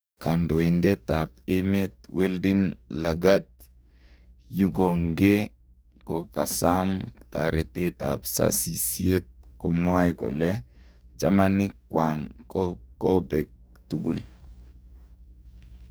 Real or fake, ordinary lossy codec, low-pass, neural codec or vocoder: fake; none; none; codec, 44.1 kHz, 2.6 kbps, DAC